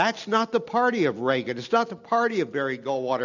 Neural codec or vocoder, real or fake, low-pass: none; real; 7.2 kHz